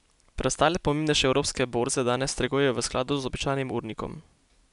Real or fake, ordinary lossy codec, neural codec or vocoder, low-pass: real; none; none; 10.8 kHz